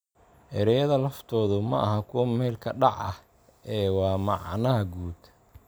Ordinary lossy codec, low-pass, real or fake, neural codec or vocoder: none; none; real; none